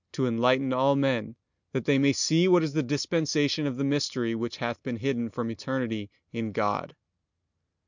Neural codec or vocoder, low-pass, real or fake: none; 7.2 kHz; real